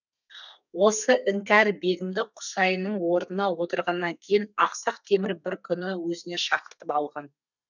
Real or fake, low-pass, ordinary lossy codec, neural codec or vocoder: fake; 7.2 kHz; none; codec, 32 kHz, 1.9 kbps, SNAC